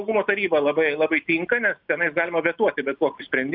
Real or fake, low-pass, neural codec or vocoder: real; 5.4 kHz; none